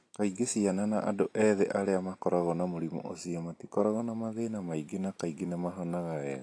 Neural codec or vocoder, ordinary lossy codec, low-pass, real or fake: none; AAC, 48 kbps; 9.9 kHz; real